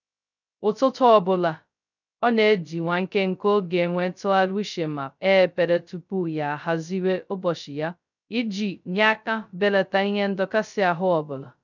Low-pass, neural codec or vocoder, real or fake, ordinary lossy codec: 7.2 kHz; codec, 16 kHz, 0.2 kbps, FocalCodec; fake; none